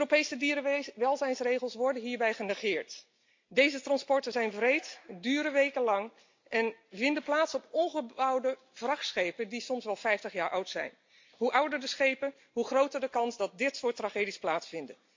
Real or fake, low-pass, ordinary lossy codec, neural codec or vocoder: real; 7.2 kHz; MP3, 48 kbps; none